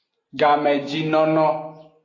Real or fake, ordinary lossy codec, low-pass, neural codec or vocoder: real; AAC, 32 kbps; 7.2 kHz; none